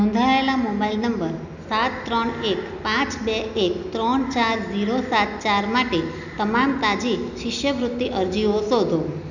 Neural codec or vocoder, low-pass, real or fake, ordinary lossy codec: none; 7.2 kHz; real; none